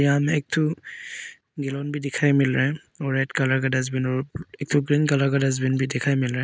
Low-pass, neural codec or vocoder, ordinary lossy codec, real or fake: none; none; none; real